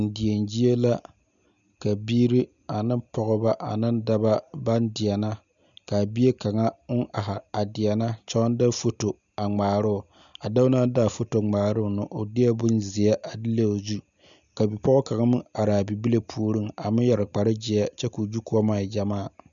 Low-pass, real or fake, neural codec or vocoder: 7.2 kHz; real; none